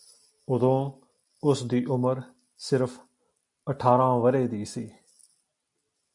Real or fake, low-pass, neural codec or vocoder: real; 10.8 kHz; none